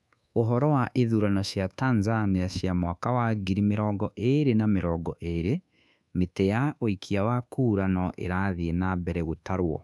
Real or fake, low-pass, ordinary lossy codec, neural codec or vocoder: fake; none; none; codec, 24 kHz, 1.2 kbps, DualCodec